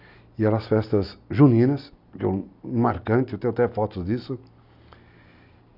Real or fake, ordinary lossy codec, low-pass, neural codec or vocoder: real; none; 5.4 kHz; none